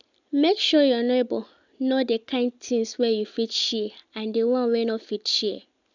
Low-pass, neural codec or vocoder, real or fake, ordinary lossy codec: 7.2 kHz; none; real; none